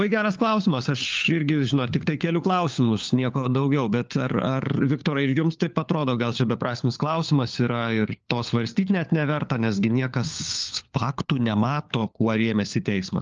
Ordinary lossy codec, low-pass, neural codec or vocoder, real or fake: Opus, 24 kbps; 7.2 kHz; codec, 16 kHz, 4 kbps, FunCodec, trained on LibriTTS, 50 frames a second; fake